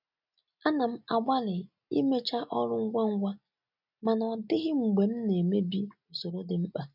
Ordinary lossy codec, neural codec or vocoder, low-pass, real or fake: none; none; 5.4 kHz; real